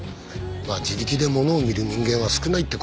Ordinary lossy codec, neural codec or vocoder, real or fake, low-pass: none; none; real; none